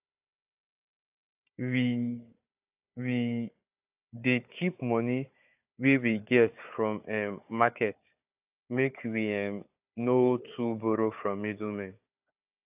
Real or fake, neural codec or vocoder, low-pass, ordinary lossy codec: fake; codec, 16 kHz, 4 kbps, FunCodec, trained on Chinese and English, 50 frames a second; 3.6 kHz; AAC, 32 kbps